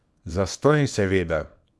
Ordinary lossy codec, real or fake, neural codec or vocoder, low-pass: none; fake; codec, 24 kHz, 0.9 kbps, WavTokenizer, small release; none